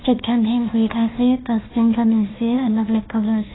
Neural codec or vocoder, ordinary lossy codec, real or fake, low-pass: codec, 16 kHz, 1 kbps, FunCodec, trained on Chinese and English, 50 frames a second; AAC, 16 kbps; fake; 7.2 kHz